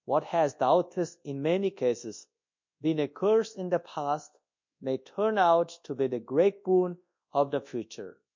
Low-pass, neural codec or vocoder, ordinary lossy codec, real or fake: 7.2 kHz; codec, 24 kHz, 0.9 kbps, WavTokenizer, large speech release; MP3, 48 kbps; fake